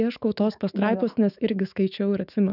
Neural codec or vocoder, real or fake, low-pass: autoencoder, 48 kHz, 128 numbers a frame, DAC-VAE, trained on Japanese speech; fake; 5.4 kHz